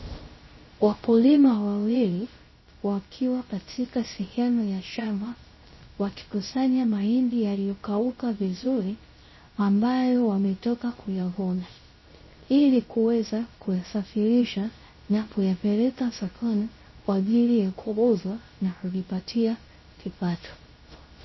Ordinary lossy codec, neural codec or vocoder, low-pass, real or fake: MP3, 24 kbps; codec, 16 kHz, 0.3 kbps, FocalCodec; 7.2 kHz; fake